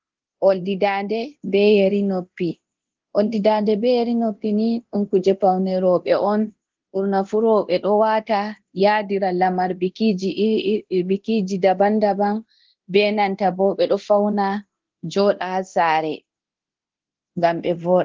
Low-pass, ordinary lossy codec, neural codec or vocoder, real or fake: 7.2 kHz; Opus, 16 kbps; codec, 24 kHz, 0.9 kbps, DualCodec; fake